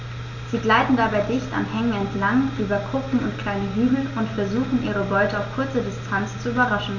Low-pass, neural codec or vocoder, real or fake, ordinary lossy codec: 7.2 kHz; none; real; none